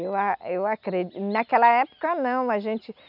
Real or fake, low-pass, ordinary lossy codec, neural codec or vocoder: real; 5.4 kHz; none; none